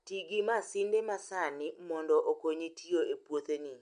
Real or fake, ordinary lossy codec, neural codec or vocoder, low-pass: real; MP3, 64 kbps; none; 9.9 kHz